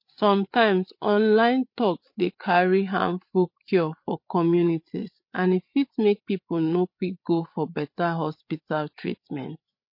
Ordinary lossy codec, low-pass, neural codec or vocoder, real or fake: MP3, 32 kbps; 5.4 kHz; codec, 16 kHz, 8 kbps, FreqCodec, larger model; fake